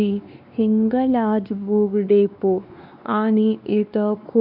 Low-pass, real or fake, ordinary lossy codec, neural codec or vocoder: 5.4 kHz; fake; none; codec, 16 kHz, 2 kbps, X-Codec, WavLM features, trained on Multilingual LibriSpeech